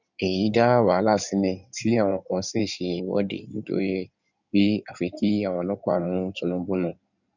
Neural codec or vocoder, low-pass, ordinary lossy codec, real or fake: codec, 16 kHz in and 24 kHz out, 2.2 kbps, FireRedTTS-2 codec; 7.2 kHz; none; fake